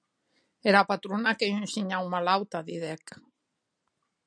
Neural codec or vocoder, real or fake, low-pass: none; real; 9.9 kHz